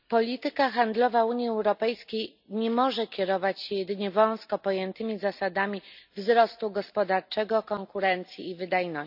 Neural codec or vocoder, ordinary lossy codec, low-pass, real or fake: none; none; 5.4 kHz; real